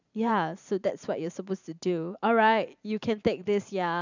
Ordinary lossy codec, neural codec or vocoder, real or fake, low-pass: none; vocoder, 44.1 kHz, 128 mel bands every 512 samples, BigVGAN v2; fake; 7.2 kHz